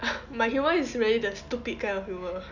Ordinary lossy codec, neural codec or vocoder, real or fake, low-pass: none; none; real; 7.2 kHz